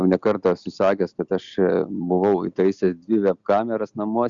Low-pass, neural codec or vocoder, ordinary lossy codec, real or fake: 7.2 kHz; none; Opus, 64 kbps; real